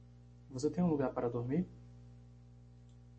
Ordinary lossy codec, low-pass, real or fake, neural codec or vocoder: MP3, 32 kbps; 9.9 kHz; real; none